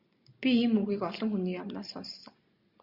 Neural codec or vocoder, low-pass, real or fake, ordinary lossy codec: none; 5.4 kHz; real; Opus, 64 kbps